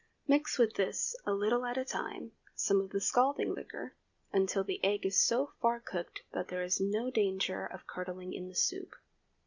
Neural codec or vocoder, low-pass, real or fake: none; 7.2 kHz; real